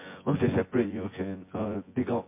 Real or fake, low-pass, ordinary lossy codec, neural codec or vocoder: fake; 3.6 kHz; MP3, 24 kbps; vocoder, 24 kHz, 100 mel bands, Vocos